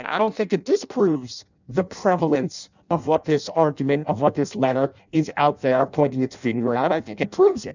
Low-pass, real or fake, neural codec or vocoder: 7.2 kHz; fake; codec, 16 kHz in and 24 kHz out, 0.6 kbps, FireRedTTS-2 codec